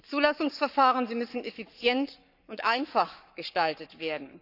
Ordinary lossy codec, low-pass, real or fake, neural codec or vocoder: none; 5.4 kHz; fake; codec, 44.1 kHz, 7.8 kbps, Pupu-Codec